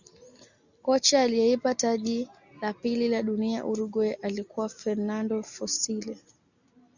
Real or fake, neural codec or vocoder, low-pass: real; none; 7.2 kHz